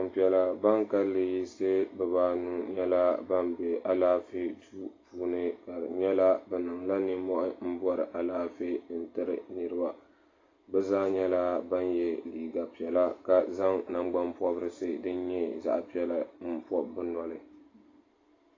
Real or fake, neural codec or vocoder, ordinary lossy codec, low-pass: real; none; AAC, 32 kbps; 7.2 kHz